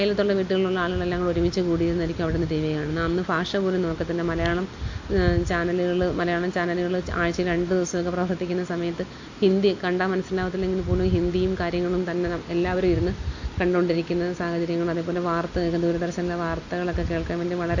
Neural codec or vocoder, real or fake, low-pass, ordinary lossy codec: none; real; 7.2 kHz; AAC, 48 kbps